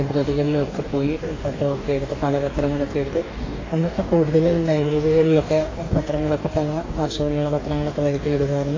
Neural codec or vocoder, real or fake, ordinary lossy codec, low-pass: codec, 44.1 kHz, 2.6 kbps, DAC; fake; AAC, 32 kbps; 7.2 kHz